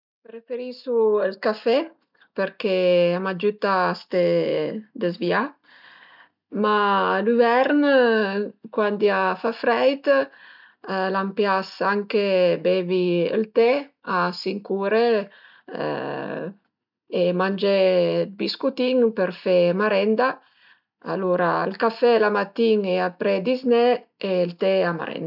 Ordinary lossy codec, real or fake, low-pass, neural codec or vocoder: none; real; 5.4 kHz; none